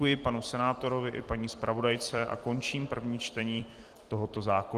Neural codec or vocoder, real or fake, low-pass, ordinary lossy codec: vocoder, 44.1 kHz, 128 mel bands every 256 samples, BigVGAN v2; fake; 14.4 kHz; Opus, 24 kbps